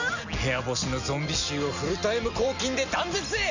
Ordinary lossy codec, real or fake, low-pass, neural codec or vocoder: none; real; 7.2 kHz; none